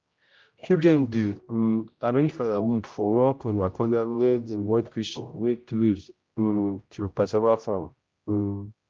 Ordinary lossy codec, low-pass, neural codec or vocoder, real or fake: Opus, 24 kbps; 7.2 kHz; codec, 16 kHz, 0.5 kbps, X-Codec, HuBERT features, trained on general audio; fake